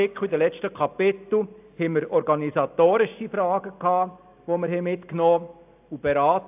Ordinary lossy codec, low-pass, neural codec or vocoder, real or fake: AAC, 32 kbps; 3.6 kHz; none; real